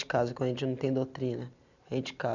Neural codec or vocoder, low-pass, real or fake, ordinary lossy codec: none; 7.2 kHz; real; none